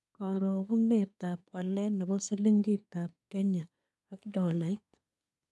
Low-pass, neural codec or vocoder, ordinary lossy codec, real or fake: none; codec, 24 kHz, 1 kbps, SNAC; none; fake